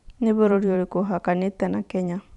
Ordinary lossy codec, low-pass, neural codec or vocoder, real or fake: none; 10.8 kHz; vocoder, 44.1 kHz, 128 mel bands every 512 samples, BigVGAN v2; fake